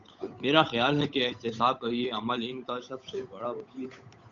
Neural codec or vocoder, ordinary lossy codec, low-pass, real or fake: codec, 16 kHz, 8 kbps, FunCodec, trained on Chinese and English, 25 frames a second; AAC, 64 kbps; 7.2 kHz; fake